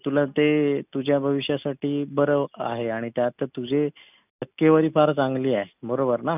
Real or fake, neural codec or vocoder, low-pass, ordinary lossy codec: real; none; 3.6 kHz; none